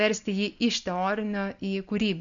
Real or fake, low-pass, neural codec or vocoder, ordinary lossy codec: real; 7.2 kHz; none; MP3, 64 kbps